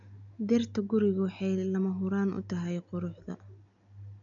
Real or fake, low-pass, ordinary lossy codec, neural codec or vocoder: real; 7.2 kHz; none; none